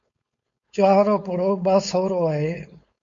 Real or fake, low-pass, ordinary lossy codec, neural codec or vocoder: fake; 7.2 kHz; MP3, 64 kbps; codec, 16 kHz, 4.8 kbps, FACodec